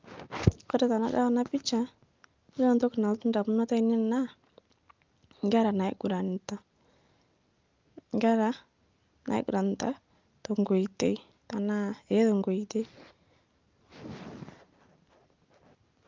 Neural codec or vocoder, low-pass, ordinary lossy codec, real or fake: none; 7.2 kHz; Opus, 24 kbps; real